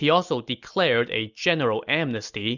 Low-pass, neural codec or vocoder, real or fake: 7.2 kHz; none; real